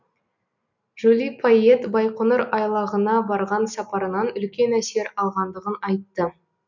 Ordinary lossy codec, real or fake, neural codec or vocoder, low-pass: none; real; none; 7.2 kHz